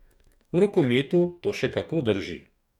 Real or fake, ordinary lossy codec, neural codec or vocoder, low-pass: fake; none; codec, 44.1 kHz, 2.6 kbps, DAC; 19.8 kHz